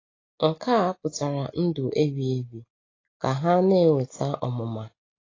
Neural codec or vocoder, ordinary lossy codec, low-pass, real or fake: none; AAC, 32 kbps; 7.2 kHz; real